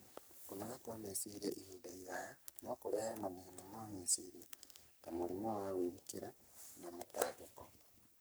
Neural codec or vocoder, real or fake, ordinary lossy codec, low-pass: codec, 44.1 kHz, 3.4 kbps, Pupu-Codec; fake; none; none